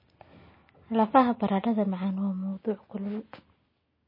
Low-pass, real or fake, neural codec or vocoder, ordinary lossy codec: 5.4 kHz; real; none; MP3, 24 kbps